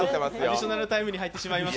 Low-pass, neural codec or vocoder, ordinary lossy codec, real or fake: none; none; none; real